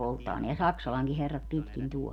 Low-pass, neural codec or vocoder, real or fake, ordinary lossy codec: 19.8 kHz; none; real; none